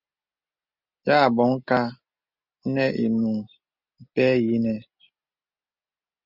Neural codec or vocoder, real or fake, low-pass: none; real; 5.4 kHz